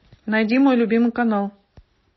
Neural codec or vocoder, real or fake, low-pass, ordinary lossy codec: none; real; 7.2 kHz; MP3, 24 kbps